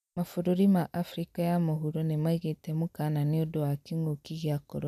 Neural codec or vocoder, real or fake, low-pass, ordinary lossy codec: none; real; 14.4 kHz; none